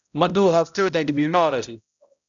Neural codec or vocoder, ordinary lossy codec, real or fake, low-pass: codec, 16 kHz, 0.5 kbps, X-Codec, HuBERT features, trained on balanced general audio; MP3, 96 kbps; fake; 7.2 kHz